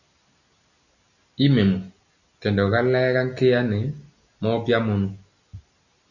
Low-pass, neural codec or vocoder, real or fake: 7.2 kHz; none; real